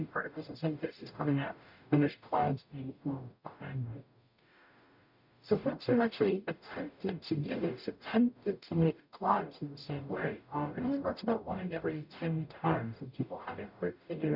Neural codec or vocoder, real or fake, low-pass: codec, 44.1 kHz, 0.9 kbps, DAC; fake; 5.4 kHz